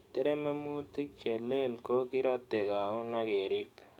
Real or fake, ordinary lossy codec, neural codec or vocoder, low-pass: fake; none; codec, 44.1 kHz, 7.8 kbps, DAC; 19.8 kHz